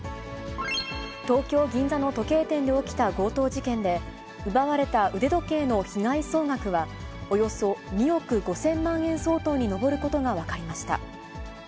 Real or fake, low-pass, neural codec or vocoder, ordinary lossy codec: real; none; none; none